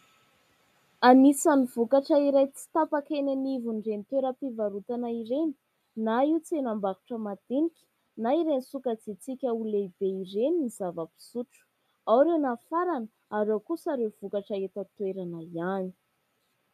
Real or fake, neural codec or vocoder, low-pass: real; none; 14.4 kHz